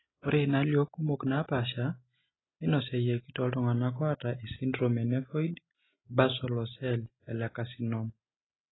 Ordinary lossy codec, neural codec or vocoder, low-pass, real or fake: AAC, 16 kbps; none; 7.2 kHz; real